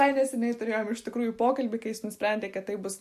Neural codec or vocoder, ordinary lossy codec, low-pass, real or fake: none; MP3, 64 kbps; 14.4 kHz; real